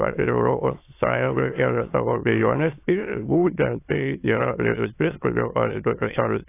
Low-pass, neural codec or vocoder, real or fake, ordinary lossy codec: 3.6 kHz; autoencoder, 22.05 kHz, a latent of 192 numbers a frame, VITS, trained on many speakers; fake; AAC, 24 kbps